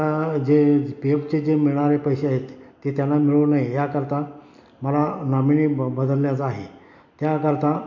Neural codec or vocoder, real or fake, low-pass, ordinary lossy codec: none; real; 7.2 kHz; none